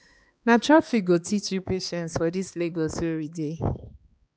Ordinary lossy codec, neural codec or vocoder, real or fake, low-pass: none; codec, 16 kHz, 2 kbps, X-Codec, HuBERT features, trained on balanced general audio; fake; none